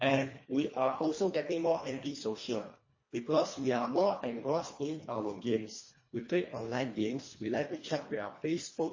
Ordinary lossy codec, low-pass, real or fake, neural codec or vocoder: MP3, 32 kbps; 7.2 kHz; fake; codec, 24 kHz, 1.5 kbps, HILCodec